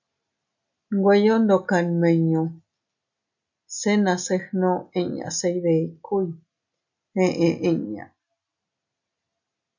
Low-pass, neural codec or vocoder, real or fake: 7.2 kHz; none; real